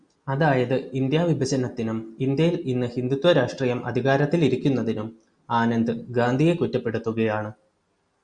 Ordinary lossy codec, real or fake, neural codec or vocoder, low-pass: Opus, 64 kbps; real; none; 9.9 kHz